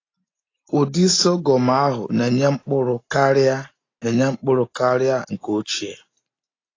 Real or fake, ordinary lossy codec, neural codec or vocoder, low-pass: real; AAC, 32 kbps; none; 7.2 kHz